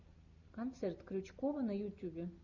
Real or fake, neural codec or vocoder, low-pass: real; none; 7.2 kHz